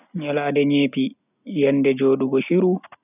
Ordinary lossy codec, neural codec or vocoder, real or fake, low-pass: none; none; real; 3.6 kHz